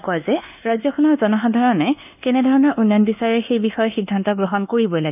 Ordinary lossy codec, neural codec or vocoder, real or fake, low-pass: none; codec, 24 kHz, 1.2 kbps, DualCodec; fake; 3.6 kHz